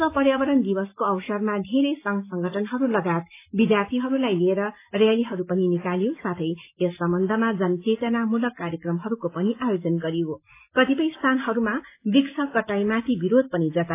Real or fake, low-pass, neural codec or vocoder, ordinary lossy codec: real; 3.6 kHz; none; AAC, 24 kbps